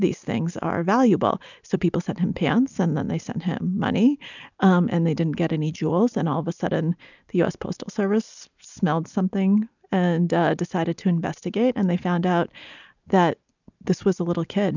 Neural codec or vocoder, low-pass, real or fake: none; 7.2 kHz; real